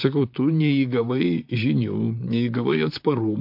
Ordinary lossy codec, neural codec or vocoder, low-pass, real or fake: MP3, 48 kbps; vocoder, 44.1 kHz, 128 mel bands, Pupu-Vocoder; 5.4 kHz; fake